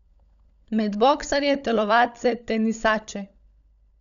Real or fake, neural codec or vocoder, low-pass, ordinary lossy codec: fake; codec, 16 kHz, 16 kbps, FunCodec, trained on LibriTTS, 50 frames a second; 7.2 kHz; none